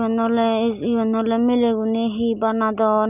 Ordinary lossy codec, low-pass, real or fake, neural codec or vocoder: none; 3.6 kHz; real; none